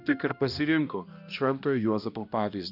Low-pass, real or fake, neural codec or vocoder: 5.4 kHz; fake; codec, 16 kHz, 1 kbps, X-Codec, HuBERT features, trained on balanced general audio